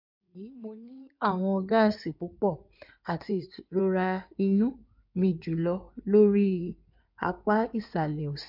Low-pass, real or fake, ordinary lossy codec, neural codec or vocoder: 5.4 kHz; fake; MP3, 48 kbps; codec, 16 kHz in and 24 kHz out, 2.2 kbps, FireRedTTS-2 codec